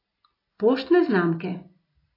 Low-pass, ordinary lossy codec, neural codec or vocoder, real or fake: 5.4 kHz; AAC, 24 kbps; none; real